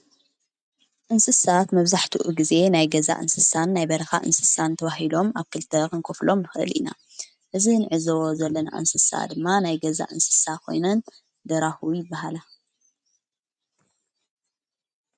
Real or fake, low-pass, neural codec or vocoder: real; 9.9 kHz; none